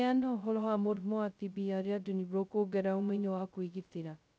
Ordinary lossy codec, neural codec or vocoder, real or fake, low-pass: none; codec, 16 kHz, 0.2 kbps, FocalCodec; fake; none